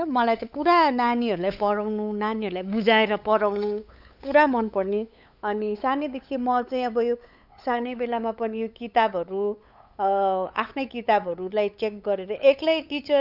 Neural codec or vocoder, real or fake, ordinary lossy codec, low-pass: codec, 16 kHz, 8 kbps, FunCodec, trained on LibriTTS, 25 frames a second; fake; none; 5.4 kHz